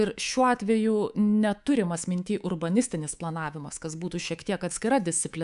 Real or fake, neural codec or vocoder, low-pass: fake; codec, 24 kHz, 3.1 kbps, DualCodec; 10.8 kHz